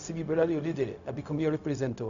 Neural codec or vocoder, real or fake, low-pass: codec, 16 kHz, 0.4 kbps, LongCat-Audio-Codec; fake; 7.2 kHz